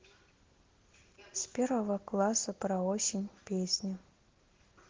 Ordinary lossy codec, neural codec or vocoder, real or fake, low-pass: Opus, 16 kbps; none; real; 7.2 kHz